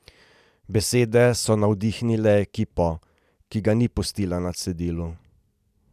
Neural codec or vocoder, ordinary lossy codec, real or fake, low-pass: none; none; real; 14.4 kHz